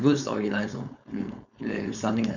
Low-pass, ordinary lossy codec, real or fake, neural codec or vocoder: 7.2 kHz; none; fake; codec, 16 kHz, 4.8 kbps, FACodec